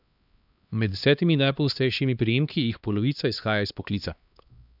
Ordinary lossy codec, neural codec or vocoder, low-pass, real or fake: none; codec, 16 kHz, 2 kbps, X-Codec, HuBERT features, trained on LibriSpeech; 5.4 kHz; fake